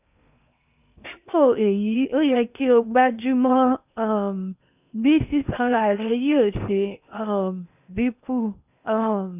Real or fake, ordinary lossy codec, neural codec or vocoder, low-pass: fake; none; codec, 16 kHz in and 24 kHz out, 0.8 kbps, FocalCodec, streaming, 65536 codes; 3.6 kHz